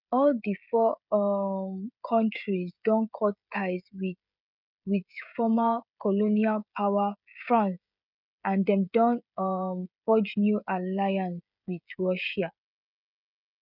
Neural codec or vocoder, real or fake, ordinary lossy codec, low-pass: codec, 16 kHz, 16 kbps, FreqCodec, smaller model; fake; none; 5.4 kHz